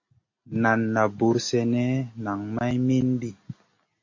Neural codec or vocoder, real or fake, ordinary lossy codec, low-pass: none; real; MP3, 32 kbps; 7.2 kHz